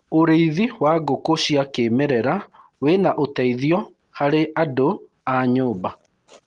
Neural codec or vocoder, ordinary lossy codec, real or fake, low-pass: none; Opus, 24 kbps; real; 10.8 kHz